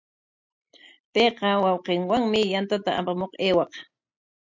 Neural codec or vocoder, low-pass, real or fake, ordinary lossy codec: none; 7.2 kHz; real; MP3, 64 kbps